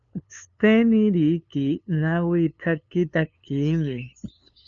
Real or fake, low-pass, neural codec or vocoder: fake; 7.2 kHz; codec, 16 kHz, 2 kbps, FunCodec, trained on LibriTTS, 25 frames a second